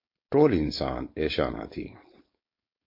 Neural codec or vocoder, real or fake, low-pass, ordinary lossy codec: codec, 16 kHz, 4.8 kbps, FACodec; fake; 5.4 kHz; MP3, 32 kbps